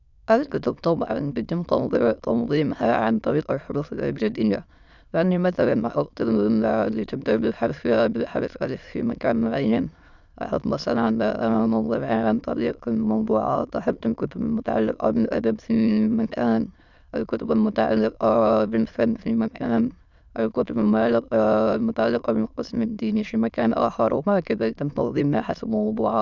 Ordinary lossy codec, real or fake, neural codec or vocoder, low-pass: none; fake; autoencoder, 22.05 kHz, a latent of 192 numbers a frame, VITS, trained on many speakers; 7.2 kHz